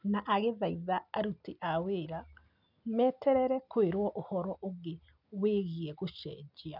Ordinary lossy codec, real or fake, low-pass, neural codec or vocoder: none; real; 5.4 kHz; none